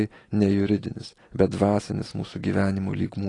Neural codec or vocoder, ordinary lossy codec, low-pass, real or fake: none; AAC, 32 kbps; 10.8 kHz; real